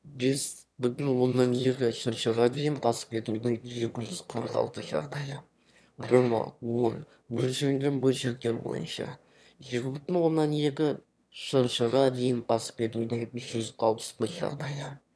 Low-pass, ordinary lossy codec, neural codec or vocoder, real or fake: none; none; autoencoder, 22.05 kHz, a latent of 192 numbers a frame, VITS, trained on one speaker; fake